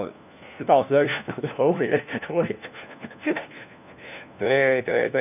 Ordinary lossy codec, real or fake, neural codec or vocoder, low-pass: none; fake; codec, 16 kHz, 1 kbps, FunCodec, trained on LibriTTS, 50 frames a second; 3.6 kHz